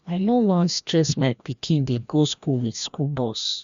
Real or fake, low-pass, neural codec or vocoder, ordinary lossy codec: fake; 7.2 kHz; codec, 16 kHz, 1 kbps, FreqCodec, larger model; MP3, 64 kbps